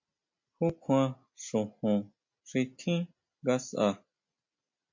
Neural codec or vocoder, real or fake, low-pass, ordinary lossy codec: none; real; 7.2 kHz; MP3, 64 kbps